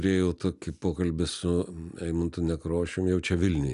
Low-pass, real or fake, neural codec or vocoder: 10.8 kHz; real; none